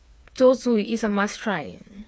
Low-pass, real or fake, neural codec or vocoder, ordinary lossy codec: none; fake; codec, 16 kHz, 4 kbps, FreqCodec, smaller model; none